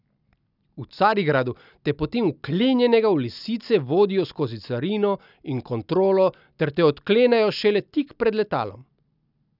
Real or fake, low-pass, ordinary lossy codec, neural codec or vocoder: real; 5.4 kHz; none; none